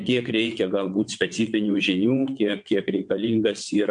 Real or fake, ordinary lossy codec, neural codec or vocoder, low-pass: fake; MP3, 64 kbps; vocoder, 22.05 kHz, 80 mel bands, WaveNeXt; 9.9 kHz